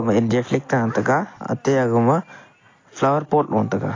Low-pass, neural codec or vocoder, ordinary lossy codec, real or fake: 7.2 kHz; none; AAC, 32 kbps; real